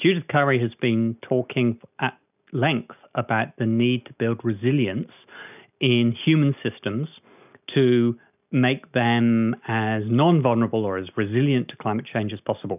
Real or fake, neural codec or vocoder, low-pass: real; none; 3.6 kHz